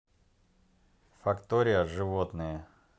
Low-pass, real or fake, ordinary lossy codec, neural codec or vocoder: none; real; none; none